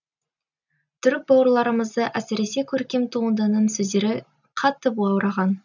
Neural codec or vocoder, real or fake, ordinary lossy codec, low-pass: none; real; none; 7.2 kHz